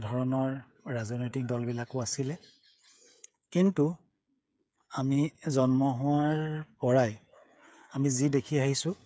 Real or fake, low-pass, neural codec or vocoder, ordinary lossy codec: fake; none; codec, 16 kHz, 8 kbps, FreqCodec, smaller model; none